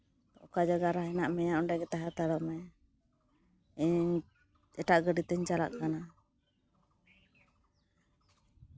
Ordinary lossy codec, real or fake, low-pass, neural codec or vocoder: none; real; none; none